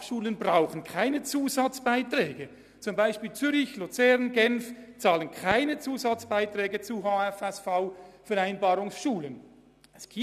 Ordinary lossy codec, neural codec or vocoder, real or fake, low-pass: none; none; real; 14.4 kHz